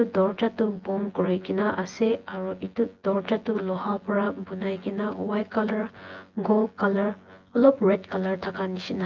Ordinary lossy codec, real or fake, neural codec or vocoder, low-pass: Opus, 32 kbps; fake; vocoder, 24 kHz, 100 mel bands, Vocos; 7.2 kHz